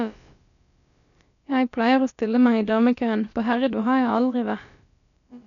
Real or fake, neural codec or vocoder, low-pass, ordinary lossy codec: fake; codec, 16 kHz, about 1 kbps, DyCAST, with the encoder's durations; 7.2 kHz; none